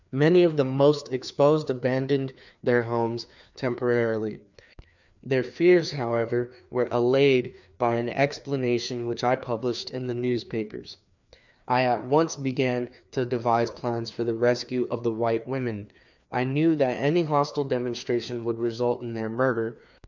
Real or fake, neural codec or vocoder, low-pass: fake; codec, 16 kHz, 2 kbps, FreqCodec, larger model; 7.2 kHz